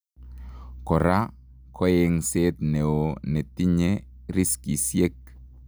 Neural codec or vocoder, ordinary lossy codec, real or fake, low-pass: none; none; real; none